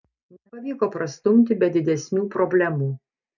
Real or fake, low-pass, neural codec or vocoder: real; 7.2 kHz; none